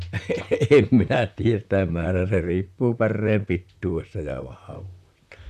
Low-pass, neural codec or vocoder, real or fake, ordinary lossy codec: 14.4 kHz; vocoder, 44.1 kHz, 128 mel bands, Pupu-Vocoder; fake; none